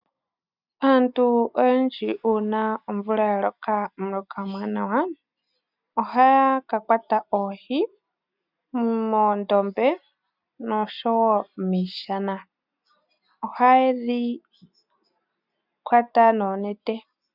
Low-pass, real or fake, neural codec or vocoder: 5.4 kHz; real; none